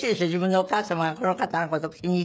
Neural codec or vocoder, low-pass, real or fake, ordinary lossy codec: codec, 16 kHz, 16 kbps, FreqCodec, smaller model; none; fake; none